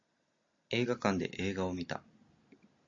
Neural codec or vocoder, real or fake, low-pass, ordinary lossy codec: none; real; 7.2 kHz; AAC, 64 kbps